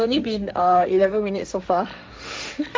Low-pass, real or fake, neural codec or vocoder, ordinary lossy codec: none; fake; codec, 16 kHz, 1.1 kbps, Voila-Tokenizer; none